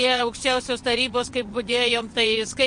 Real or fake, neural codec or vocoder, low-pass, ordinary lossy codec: fake; vocoder, 22.05 kHz, 80 mel bands, WaveNeXt; 9.9 kHz; MP3, 48 kbps